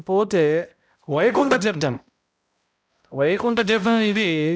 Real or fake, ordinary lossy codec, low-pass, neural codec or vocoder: fake; none; none; codec, 16 kHz, 0.5 kbps, X-Codec, HuBERT features, trained on balanced general audio